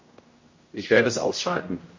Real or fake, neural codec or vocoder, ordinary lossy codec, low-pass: fake; codec, 16 kHz, 0.5 kbps, X-Codec, HuBERT features, trained on general audio; MP3, 32 kbps; 7.2 kHz